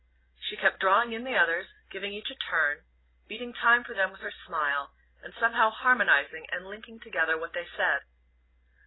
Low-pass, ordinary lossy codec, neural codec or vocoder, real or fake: 7.2 kHz; AAC, 16 kbps; none; real